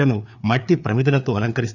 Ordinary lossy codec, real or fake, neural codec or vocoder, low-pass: none; fake; codec, 16 kHz, 4 kbps, FreqCodec, larger model; 7.2 kHz